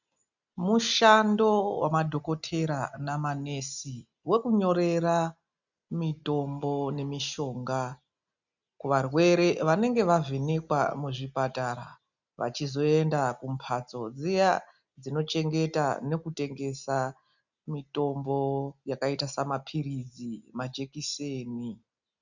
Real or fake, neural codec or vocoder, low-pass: real; none; 7.2 kHz